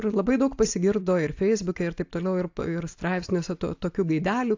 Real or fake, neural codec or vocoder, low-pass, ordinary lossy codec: real; none; 7.2 kHz; AAC, 48 kbps